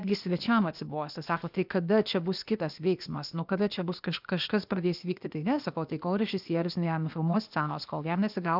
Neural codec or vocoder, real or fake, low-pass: codec, 16 kHz, 0.8 kbps, ZipCodec; fake; 5.4 kHz